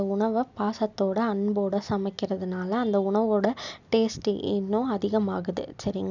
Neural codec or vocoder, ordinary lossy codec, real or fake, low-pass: none; none; real; 7.2 kHz